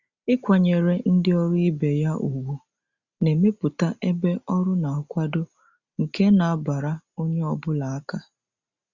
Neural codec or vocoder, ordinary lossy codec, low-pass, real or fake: none; Opus, 64 kbps; 7.2 kHz; real